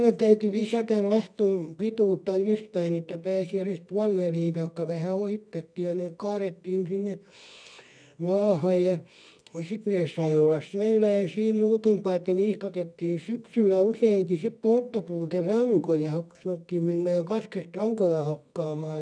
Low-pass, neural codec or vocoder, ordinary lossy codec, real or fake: 9.9 kHz; codec, 24 kHz, 0.9 kbps, WavTokenizer, medium music audio release; none; fake